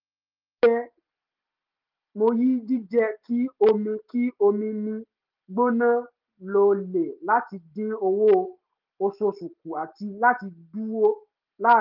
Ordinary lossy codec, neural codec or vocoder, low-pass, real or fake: Opus, 32 kbps; none; 5.4 kHz; real